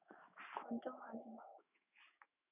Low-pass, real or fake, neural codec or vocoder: 3.6 kHz; fake; autoencoder, 48 kHz, 128 numbers a frame, DAC-VAE, trained on Japanese speech